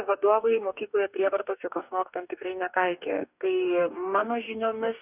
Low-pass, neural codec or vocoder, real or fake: 3.6 kHz; codec, 44.1 kHz, 2.6 kbps, DAC; fake